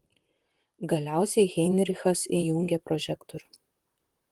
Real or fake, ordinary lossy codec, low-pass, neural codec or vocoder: fake; Opus, 24 kbps; 19.8 kHz; vocoder, 44.1 kHz, 128 mel bands, Pupu-Vocoder